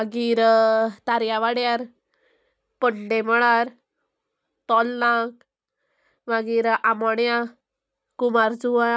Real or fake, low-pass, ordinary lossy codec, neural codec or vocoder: real; none; none; none